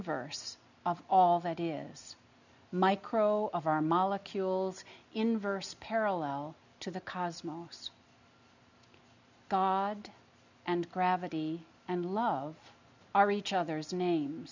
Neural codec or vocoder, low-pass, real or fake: none; 7.2 kHz; real